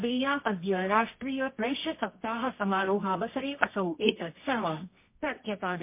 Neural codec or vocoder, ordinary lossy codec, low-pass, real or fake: codec, 24 kHz, 0.9 kbps, WavTokenizer, medium music audio release; MP3, 24 kbps; 3.6 kHz; fake